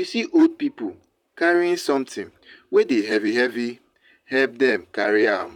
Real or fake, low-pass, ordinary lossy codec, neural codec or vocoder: fake; 19.8 kHz; none; vocoder, 44.1 kHz, 128 mel bands, Pupu-Vocoder